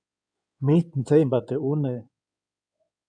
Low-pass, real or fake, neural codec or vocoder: 9.9 kHz; fake; codec, 16 kHz in and 24 kHz out, 2.2 kbps, FireRedTTS-2 codec